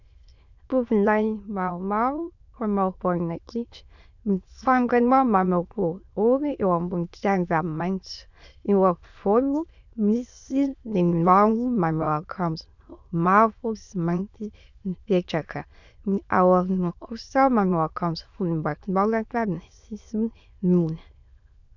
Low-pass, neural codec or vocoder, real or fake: 7.2 kHz; autoencoder, 22.05 kHz, a latent of 192 numbers a frame, VITS, trained on many speakers; fake